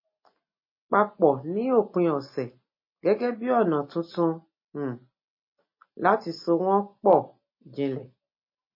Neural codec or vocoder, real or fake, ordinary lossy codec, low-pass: none; real; MP3, 24 kbps; 5.4 kHz